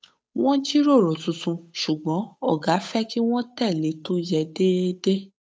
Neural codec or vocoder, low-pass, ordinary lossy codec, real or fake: codec, 16 kHz, 8 kbps, FunCodec, trained on Chinese and English, 25 frames a second; none; none; fake